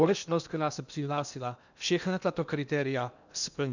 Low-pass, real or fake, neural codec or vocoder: 7.2 kHz; fake; codec, 16 kHz in and 24 kHz out, 0.8 kbps, FocalCodec, streaming, 65536 codes